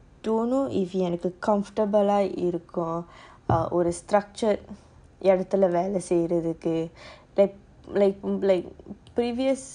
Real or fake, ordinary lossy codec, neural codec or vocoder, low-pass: real; none; none; 9.9 kHz